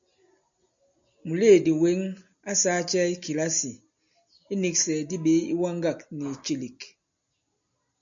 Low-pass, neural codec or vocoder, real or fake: 7.2 kHz; none; real